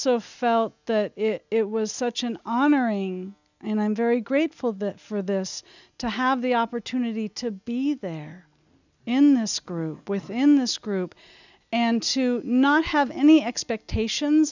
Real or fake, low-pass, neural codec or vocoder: real; 7.2 kHz; none